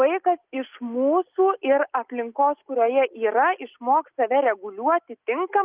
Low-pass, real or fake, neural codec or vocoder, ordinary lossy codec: 3.6 kHz; real; none; Opus, 32 kbps